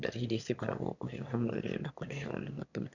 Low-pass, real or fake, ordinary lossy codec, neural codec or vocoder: 7.2 kHz; fake; none; autoencoder, 22.05 kHz, a latent of 192 numbers a frame, VITS, trained on one speaker